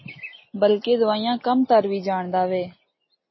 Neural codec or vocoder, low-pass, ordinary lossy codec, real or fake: none; 7.2 kHz; MP3, 24 kbps; real